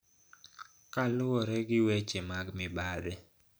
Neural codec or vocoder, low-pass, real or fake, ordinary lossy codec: none; none; real; none